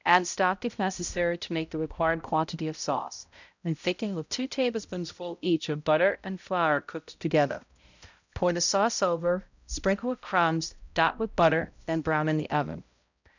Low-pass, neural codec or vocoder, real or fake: 7.2 kHz; codec, 16 kHz, 0.5 kbps, X-Codec, HuBERT features, trained on balanced general audio; fake